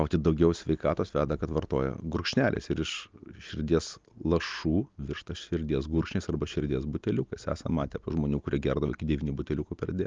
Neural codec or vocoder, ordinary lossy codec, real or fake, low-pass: none; Opus, 32 kbps; real; 7.2 kHz